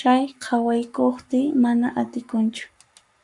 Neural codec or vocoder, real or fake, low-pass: codec, 44.1 kHz, 7.8 kbps, Pupu-Codec; fake; 10.8 kHz